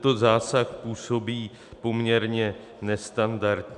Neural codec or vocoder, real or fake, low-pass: none; real; 10.8 kHz